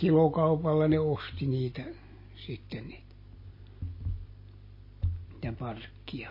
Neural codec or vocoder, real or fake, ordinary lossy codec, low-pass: none; real; MP3, 24 kbps; 5.4 kHz